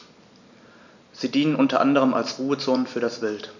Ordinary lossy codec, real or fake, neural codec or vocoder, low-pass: none; real; none; 7.2 kHz